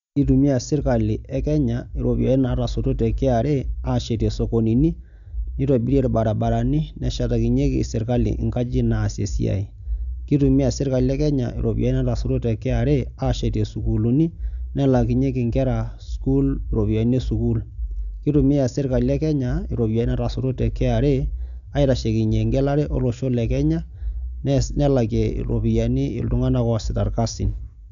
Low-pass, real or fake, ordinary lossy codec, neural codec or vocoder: 7.2 kHz; real; none; none